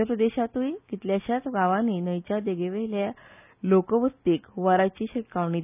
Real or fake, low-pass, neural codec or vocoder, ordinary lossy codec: real; 3.6 kHz; none; none